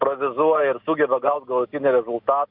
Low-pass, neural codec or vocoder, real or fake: 5.4 kHz; none; real